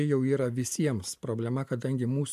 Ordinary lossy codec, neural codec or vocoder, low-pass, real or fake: AAC, 96 kbps; none; 14.4 kHz; real